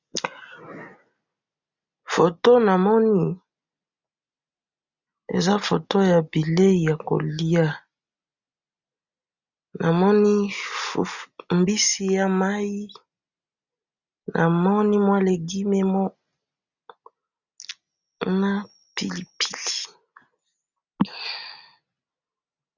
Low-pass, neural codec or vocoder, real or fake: 7.2 kHz; none; real